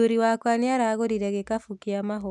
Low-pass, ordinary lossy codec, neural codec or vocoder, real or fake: none; none; none; real